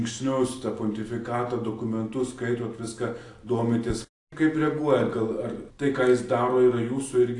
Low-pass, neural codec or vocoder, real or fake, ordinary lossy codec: 10.8 kHz; none; real; AAC, 48 kbps